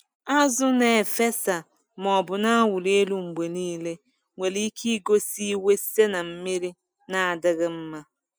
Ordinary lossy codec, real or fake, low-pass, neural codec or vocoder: none; real; none; none